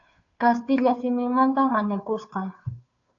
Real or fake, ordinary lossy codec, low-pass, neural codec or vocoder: fake; AAC, 64 kbps; 7.2 kHz; codec, 16 kHz, 2 kbps, FunCodec, trained on Chinese and English, 25 frames a second